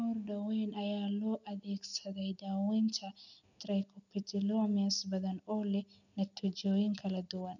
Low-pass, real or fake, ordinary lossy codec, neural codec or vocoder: 7.2 kHz; real; none; none